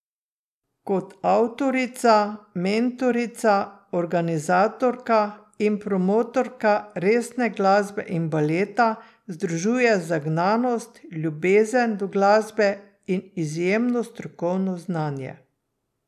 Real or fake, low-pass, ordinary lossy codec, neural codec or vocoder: real; 14.4 kHz; none; none